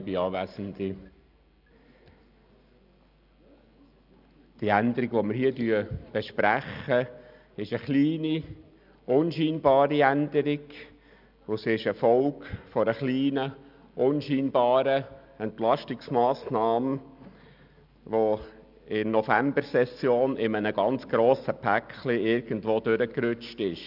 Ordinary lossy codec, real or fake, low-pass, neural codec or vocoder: none; real; 5.4 kHz; none